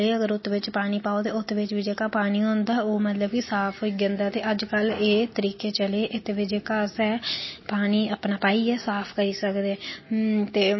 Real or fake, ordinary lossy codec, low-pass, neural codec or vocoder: real; MP3, 24 kbps; 7.2 kHz; none